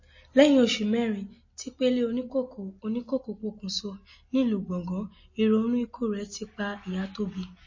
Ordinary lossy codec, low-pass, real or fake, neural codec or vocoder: MP3, 32 kbps; 7.2 kHz; real; none